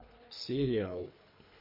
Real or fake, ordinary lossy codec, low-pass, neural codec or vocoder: fake; MP3, 32 kbps; 5.4 kHz; codec, 24 kHz, 3 kbps, HILCodec